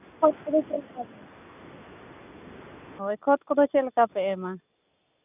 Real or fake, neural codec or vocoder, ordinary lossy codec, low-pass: real; none; none; 3.6 kHz